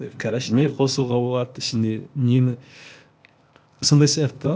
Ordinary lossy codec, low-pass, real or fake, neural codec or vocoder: none; none; fake; codec, 16 kHz, 0.8 kbps, ZipCodec